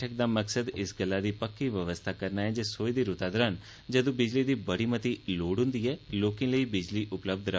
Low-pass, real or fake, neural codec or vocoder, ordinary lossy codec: none; real; none; none